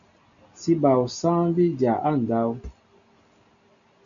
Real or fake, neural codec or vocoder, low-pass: real; none; 7.2 kHz